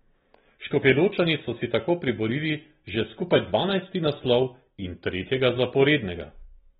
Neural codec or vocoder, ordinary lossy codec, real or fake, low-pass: none; AAC, 16 kbps; real; 7.2 kHz